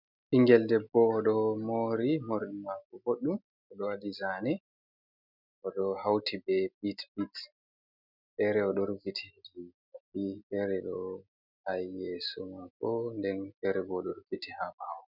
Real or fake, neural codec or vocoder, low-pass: real; none; 5.4 kHz